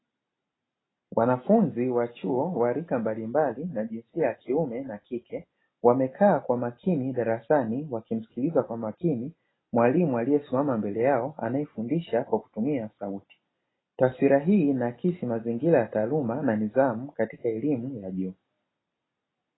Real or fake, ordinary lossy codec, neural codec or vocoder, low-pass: real; AAC, 16 kbps; none; 7.2 kHz